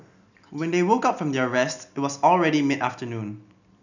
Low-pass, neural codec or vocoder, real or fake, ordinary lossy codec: 7.2 kHz; none; real; none